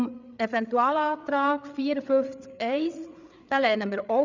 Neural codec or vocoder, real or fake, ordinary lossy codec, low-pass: codec, 16 kHz, 8 kbps, FreqCodec, larger model; fake; none; 7.2 kHz